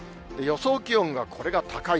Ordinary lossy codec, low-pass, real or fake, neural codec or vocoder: none; none; real; none